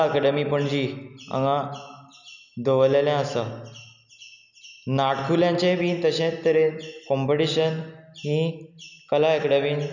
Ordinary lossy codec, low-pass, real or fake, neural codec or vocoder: none; 7.2 kHz; real; none